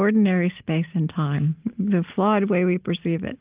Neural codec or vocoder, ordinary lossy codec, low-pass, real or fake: none; Opus, 32 kbps; 3.6 kHz; real